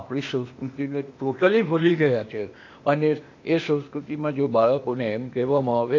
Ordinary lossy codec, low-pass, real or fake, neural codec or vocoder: AAC, 48 kbps; 7.2 kHz; fake; codec, 16 kHz, 0.8 kbps, ZipCodec